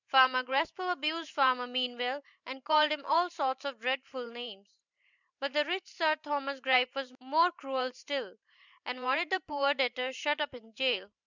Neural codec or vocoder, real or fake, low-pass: vocoder, 44.1 kHz, 128 mel bands every 512 samples, BigVGAN v2; fake; 7.2 kHz